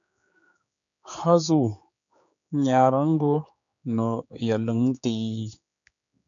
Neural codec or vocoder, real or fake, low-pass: codec, 16 kHz, 4 kbps, X-Codec, HuBERT features, trained on general audio; fake; 7.2 kHz